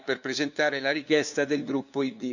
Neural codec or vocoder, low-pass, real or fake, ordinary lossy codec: codec, 16 kHz, 2 kbps, FunCodec, trained on LibriTTS, 25 frames a second; 7.2 kHz; fake; none